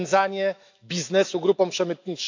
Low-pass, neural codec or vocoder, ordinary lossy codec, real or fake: 7.2 kHz; autoencoder, 48 kHz, 128 numbers a frame, DAC-VAE, trained on Japanese speech; AAC, 48 kbps; fake